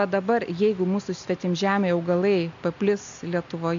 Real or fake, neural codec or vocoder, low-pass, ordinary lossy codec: real; none; 7.2 kHz; MP3, 64 kbps